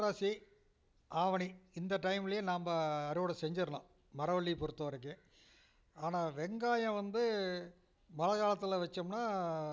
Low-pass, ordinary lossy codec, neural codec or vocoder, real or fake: none; none; none; real